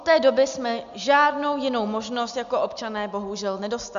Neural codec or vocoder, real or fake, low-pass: none; real; 7.2 kHz